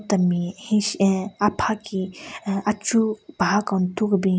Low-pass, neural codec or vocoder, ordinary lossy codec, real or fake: none; none; none; real